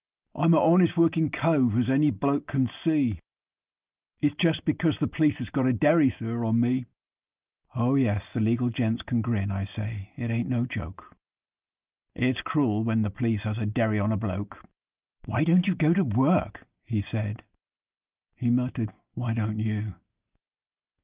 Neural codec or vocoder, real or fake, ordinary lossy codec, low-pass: none; real; Opus, 32 kbps; 3.6 kHz